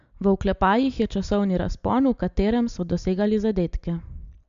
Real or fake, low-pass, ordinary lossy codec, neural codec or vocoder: real; 7.2 kHz; MP3, 64 kbps; none